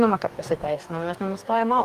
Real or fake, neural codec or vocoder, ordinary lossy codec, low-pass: fake; codec, 44.1 kHz, 2.6 kbps, DAC; Opus, 32 kbps; 14.4 kHz